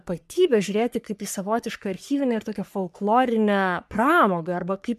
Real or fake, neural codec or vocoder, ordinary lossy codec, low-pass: fake; codec, 44.1 kHz, 3.4 kbps, Pupu-Codec; MP3, 96 kbps; 14.4 kHz